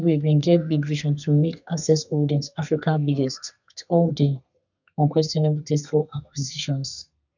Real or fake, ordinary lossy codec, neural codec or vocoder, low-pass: fake; none; codec, 32 kHz, 1.9 kbps, SNAC; 7.2 kHz